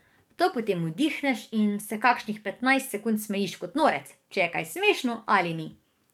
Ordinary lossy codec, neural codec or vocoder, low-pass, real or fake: MP3, 96 kbps; codec, 44.1 kHz, 7.8 kbps, DAC; 19.8 kHz; fake